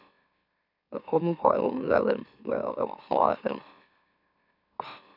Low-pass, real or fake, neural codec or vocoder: 5.4 kHz; fake; autoencoder, 44.1 kHz, a latent of 192 numbers a frame, MeloTTS